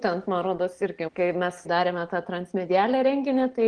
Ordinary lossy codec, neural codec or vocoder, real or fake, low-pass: Opus, 16 kbps; none; real; 9.9 kHz